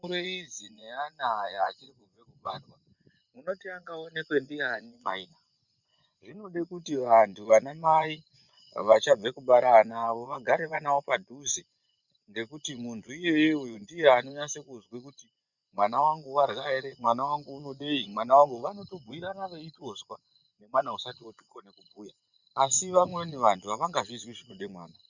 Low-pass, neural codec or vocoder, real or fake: 7.2 kHz; vocoder, 24 kHz, 100 mel bands, Vocos; fake